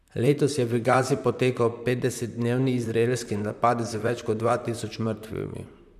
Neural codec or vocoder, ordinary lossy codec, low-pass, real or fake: vocoder, 44.1 kHz, 128 mel bands, Pupu-Vocoder; none; 14.4 kHz; fake